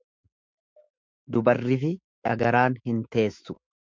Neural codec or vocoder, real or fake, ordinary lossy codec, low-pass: autoencoder, 48 kHz, 128 numbers a frame, DAC-VAE, trained on Japanese speech; fake; MP3, 64 kbps; 7.2 kHz